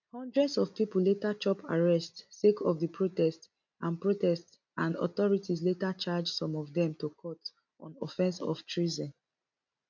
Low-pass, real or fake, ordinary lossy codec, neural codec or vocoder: 7.2 kHz; real; MP3, 64 kbps; none